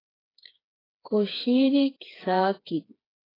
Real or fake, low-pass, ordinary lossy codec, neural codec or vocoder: fake; 5.4 kHz; AAC, 24 kbps; codec, 16 kHz, 4 kbps, FreqCodec, smaller model